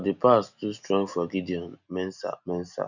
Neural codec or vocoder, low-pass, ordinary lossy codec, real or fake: none; 7.2 kHz; none; real